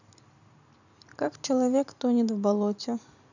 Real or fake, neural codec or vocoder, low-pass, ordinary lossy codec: fake; vocoder, 44.1 kHz, 128 mel bands every 512 samples, BigVGAN v2; 7.2 kHz; none